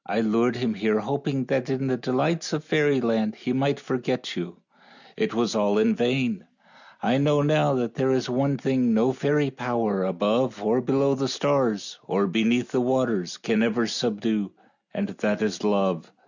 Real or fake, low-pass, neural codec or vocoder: real; 7.2 kHz; none